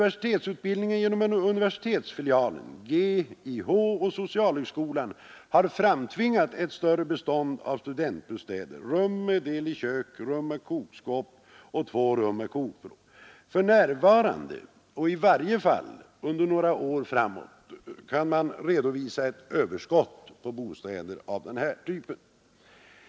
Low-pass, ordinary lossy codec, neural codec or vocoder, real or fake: none; none; none; real